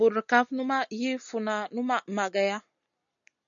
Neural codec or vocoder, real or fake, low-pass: none; real; 7.2 kHz